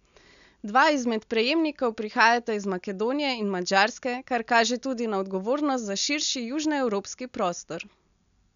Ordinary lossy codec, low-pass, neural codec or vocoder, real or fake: none; 7.2 kHz; none; real